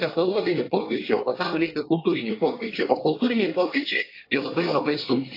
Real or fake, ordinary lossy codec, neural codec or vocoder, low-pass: fake; AAC, 32 kbps; codec, 24 kHz, 1 kbps, SNAC; 5.4 kHz